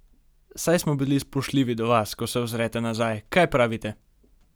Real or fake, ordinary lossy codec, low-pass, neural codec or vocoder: real; none; none; none